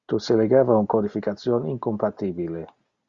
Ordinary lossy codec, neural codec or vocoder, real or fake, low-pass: Opus, 64 kbps; none; real; 7.2 kHz